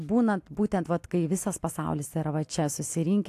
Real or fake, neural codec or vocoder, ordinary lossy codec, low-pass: real; none; AAC, 64 kbps; 14.4 kHz